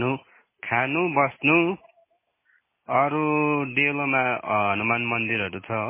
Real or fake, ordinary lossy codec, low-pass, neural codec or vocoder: real; MP3, 16 kbps; 3.6 kHz; none